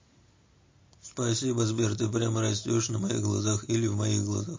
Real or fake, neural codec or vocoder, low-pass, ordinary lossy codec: real; none; 7.2 kHz; MP3, 32 kbps